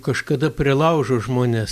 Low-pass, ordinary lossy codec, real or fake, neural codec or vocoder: 14.4 kHz; Opus, 64 kbps; real; none